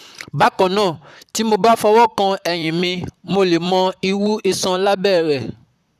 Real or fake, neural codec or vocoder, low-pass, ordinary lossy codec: fake; vocoder, 44.1 kHz, 128 mel bands, Pupu-Vocoder; 14.4 kHz; none